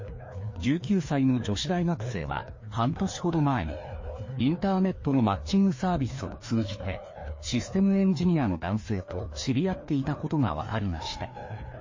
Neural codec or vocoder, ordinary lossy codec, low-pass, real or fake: codec, 16 kHz, 2 kbps, FreqCodec, larger model; MP3, 32 kbps; 7.2 kHz; fake